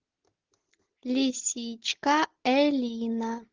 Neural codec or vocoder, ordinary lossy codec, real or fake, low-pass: none; Opus, 16 kbps; real; 7.2 kHz